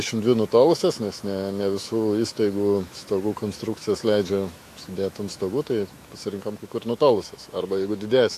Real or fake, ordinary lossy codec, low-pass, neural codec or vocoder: fake; AAC, 64 kbps; 14.4 kHz; autoencoder, 48 kHz, 128 numbers a frame, DAC-VAE, trained on Japanese speech